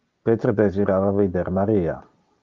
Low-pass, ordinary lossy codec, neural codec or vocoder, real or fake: 7.2 kHz; Opus, 24 kbps; none; real